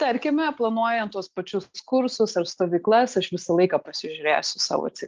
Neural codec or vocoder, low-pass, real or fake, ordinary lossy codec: none; 7.2 kHz; real; Opus, 24 kbps